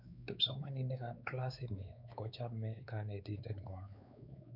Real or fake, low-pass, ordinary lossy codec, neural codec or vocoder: fake; 5.4 kHz; none; codec, 16 kHz, 4 kbps, X-Codec, WavLM features, trained on Multilingual LibriSpeech